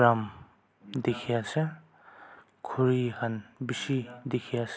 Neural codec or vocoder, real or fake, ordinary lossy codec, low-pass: none; real; none; none